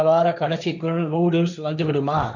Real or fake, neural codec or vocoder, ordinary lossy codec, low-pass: fake; codec, 16 kHz, 1.1 kbps, Voila-Tokenizer; none; 7.2 kHz